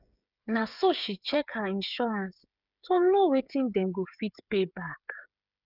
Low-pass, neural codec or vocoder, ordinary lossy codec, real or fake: 5.4 kHz; codec, 16 kHz, 8 kbps, FreqCodec, smaller model; none; fake